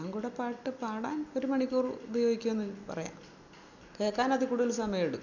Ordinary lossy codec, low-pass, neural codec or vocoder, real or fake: none; 7.2 kHz; none; real